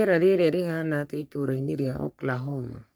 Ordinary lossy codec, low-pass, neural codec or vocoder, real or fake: none; none; codec, 44.1 kHz, 3.4 kbps, Pupu-Codec; fake